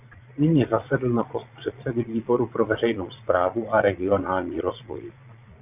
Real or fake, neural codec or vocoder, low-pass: fake; vocoder, 22.05 kHz, 80 mel bands, Vocos; 3.6 kHz